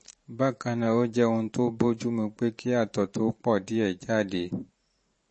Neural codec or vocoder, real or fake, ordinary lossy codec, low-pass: vocoder, 44.1 kHz, 128 mel bands every 512 samples, BigVGAN v2; fake; MP3, 32 kbps; 10.8 kHz